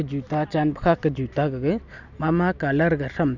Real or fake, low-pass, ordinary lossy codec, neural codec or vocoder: real; 7.2 kHz; none; none